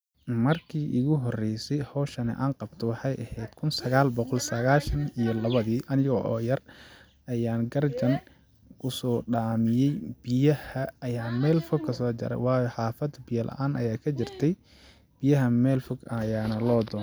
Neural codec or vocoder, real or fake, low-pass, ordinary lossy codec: none; real; none; none